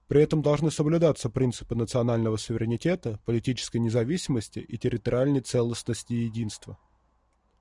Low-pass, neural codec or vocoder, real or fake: 10.8 kHz; none; real